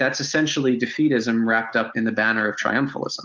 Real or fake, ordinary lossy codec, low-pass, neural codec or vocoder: real; Opus, 32 kbps; 7.2 kHz; none